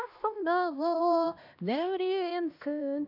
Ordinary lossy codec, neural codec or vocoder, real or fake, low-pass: none; codec, 16 kHz, 1 kbps, X-Codec, HuBERT features, trained on LibriSpeech; fake; 5.4 kHz